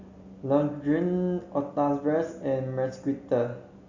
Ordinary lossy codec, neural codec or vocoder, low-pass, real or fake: Opus, 64 kbps; none; 7.2 kHz; real